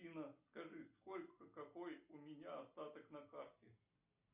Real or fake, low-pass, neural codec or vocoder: real; 3.6 kHz; none